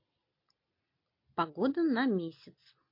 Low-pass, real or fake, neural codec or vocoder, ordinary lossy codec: 5.4 kHz; real; none; MP3, 32 kbps